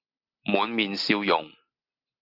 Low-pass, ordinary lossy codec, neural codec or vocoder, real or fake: 5.4 kHz; Opus, 64 kbps; none; real